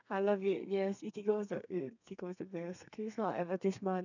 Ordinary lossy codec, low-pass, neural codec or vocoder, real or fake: none; 7.2 kHz; codec, 32 kHz, 1.9 kbps, SNAC; fake